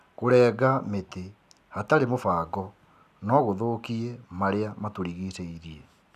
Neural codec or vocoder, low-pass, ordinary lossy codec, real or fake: none; 14.4 kHz; none; real